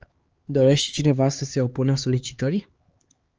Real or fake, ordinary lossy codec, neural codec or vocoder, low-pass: fake; Opus, 24 kbps; codec, 16 kHz, 4 kbps, X-Codec, HuBERT features, trained on LibriSpeech; 7.2 kHz